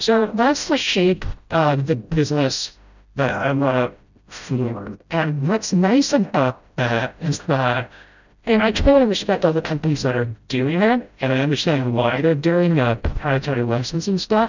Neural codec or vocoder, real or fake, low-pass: codec, 16 kHz, 0.5 kbps, FreqCodec, smaller model; fake; 7.2 kHz